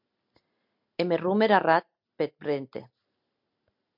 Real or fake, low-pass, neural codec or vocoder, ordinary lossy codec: real; 5.4 kHz; none; MP3, 48 kbps